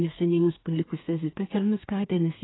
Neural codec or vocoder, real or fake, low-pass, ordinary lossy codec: codec, 32 kHz, 1.9 kbps, SNAC; fake; 7.2 kHz; AAC, 16 kbps